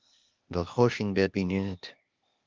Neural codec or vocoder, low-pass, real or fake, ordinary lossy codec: codec, 16 kHz, 0.8 kbps, ZipCodec; 7.2 kHz; fake; Opus, 24 kbps